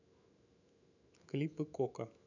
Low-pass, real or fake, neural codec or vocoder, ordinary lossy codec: 7.2 kHz; fake; autoencoder, 48 kHz, 128 numbers a frame, DAC-VAE, trained on Japanese speech; none